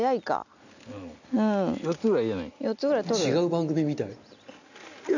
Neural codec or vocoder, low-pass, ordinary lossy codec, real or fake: none; 7.2 kHz; none; real